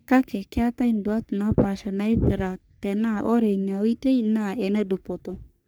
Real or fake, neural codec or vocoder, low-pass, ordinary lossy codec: fake; codec, 44.1 kHz, 3.4 kbps, Pupu-Codec; none; none